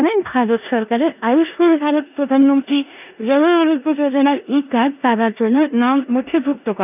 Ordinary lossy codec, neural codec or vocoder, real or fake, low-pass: none; codec, 16 kHz in and 24 kHz out, 0.9 kbps, LongCat-Audio-Codec, four codebook decoder; fake; 3.6 kHz